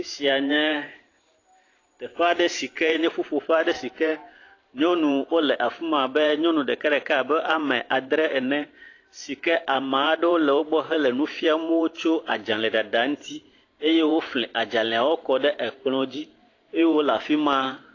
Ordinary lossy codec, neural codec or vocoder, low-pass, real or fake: AAC, 32 kbps; vocoder, 44.1 kHz, 128 mel bands every 512 samples, BigVGAN v2; 7.2 kHz; fake